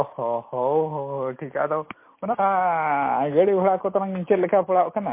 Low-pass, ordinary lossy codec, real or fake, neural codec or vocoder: 3.6 kHz; MP3, 24 kbps; real; none